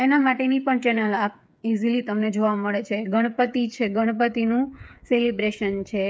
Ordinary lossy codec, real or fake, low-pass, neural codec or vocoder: none; fake; none; codec, 16 kHz, 8 kbps, FreqCodec, smaller model